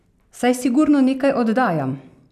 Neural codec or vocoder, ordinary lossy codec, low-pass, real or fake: none; none; 14.4 kHz; real